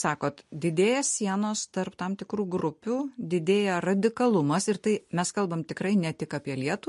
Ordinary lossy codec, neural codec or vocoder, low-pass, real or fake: MP3, 48 kbps; none; 14.4 kHz; real